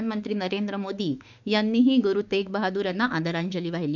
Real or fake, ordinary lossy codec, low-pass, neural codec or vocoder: fake; none; 7.2 kHz; autoencoder, 48 kHz, 32 numbers a frame, DAC-VAE, trained on Japanese speech